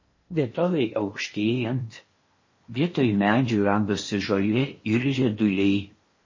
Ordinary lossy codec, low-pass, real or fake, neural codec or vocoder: MP3, 32 kbps; 7.2 kHz; fake; codec, 16 kHz in and 24 kHz out, 0.8 kbps, FocalCodec, streaming, 65536 codes